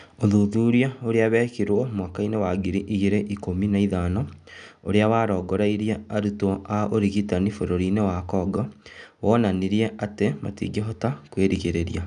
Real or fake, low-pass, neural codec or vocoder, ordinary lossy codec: real; 9.9 kHz; none; none